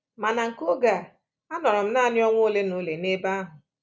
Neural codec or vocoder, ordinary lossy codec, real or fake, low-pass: none; none; real; none